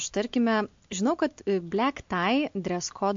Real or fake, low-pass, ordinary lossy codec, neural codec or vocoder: real; 7.2 kHz; MP3, 48 kbps; none